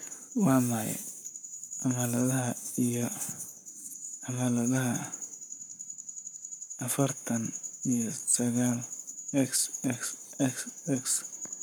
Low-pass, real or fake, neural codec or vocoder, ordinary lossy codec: none; fake; codec, 44.1 kHz, 7.8 kbps, Pupu-Codec; none